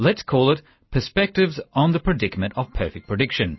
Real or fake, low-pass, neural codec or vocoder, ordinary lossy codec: real; 7.2 kHz; none; MP3, 24 kbps